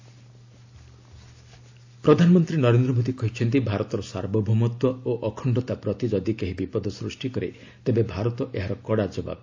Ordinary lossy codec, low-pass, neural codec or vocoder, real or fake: AAC, 48 kbps; 7.2 kHz; none; real